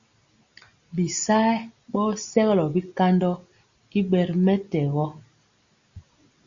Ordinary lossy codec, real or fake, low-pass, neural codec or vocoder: Opus, 64 kbps; real; 7.2 kHz; none